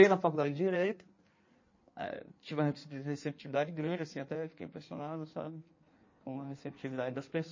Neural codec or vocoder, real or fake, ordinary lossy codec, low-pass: codec, 16 kHz in and 24 kHz out, 1.1 kbps, FireRedTTS-2 codec; fake; MP3, 32 kbps; 7.2 kHz